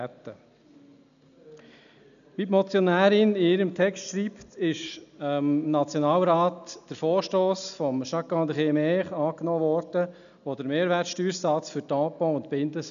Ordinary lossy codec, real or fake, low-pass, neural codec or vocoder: none; real; 7.2 kHz; none